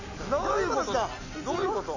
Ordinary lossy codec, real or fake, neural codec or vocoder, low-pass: none; real; none; 7.2 kHz